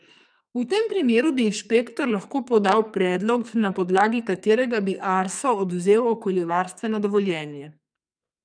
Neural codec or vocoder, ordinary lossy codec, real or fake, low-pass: codec, 44.1 kHz, 2.6 kbps, SNAC; none; fake; 9.9 kHz